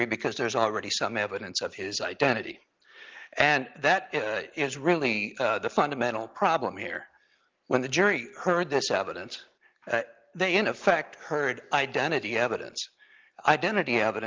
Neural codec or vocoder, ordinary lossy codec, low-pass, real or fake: none; Opus, 24 kbps; 7.2 kHz; real